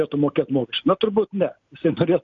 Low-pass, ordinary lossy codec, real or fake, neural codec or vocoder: 7.2 kHz; MP3, 64 kbps; real; none